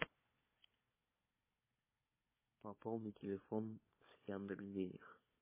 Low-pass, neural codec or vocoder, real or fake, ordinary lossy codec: 3.6 kHz; codec, 16 kHz, 4 kbps, FunCodec, trained on Chinese and English, 50 frames a second; fake; MP3, 16 kbps